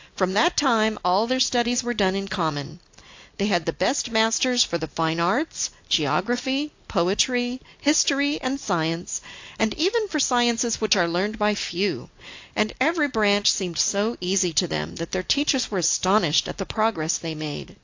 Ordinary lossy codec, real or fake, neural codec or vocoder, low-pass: AAC, 48 kbps; real; none; 7.2 kHz